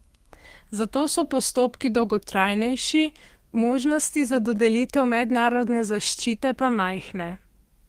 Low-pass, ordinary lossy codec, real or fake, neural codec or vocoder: 14.4 kHz; Opus, 24 kbps; fake; codec, 32 kHz, 1.9 kbps, SNAC